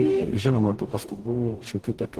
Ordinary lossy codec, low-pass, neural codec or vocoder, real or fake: Opus, 16 kbps; 14.4 kHz; codec, 44.1 kHz, 0.9 kbps, DAC; fake